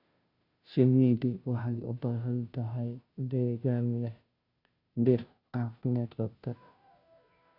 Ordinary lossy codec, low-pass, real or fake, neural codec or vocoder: none; 5.4 kHz; fake; codec, 16 kHz, 0.5 kbps, FunCodec, trained on Chinese and English, 25 frames a second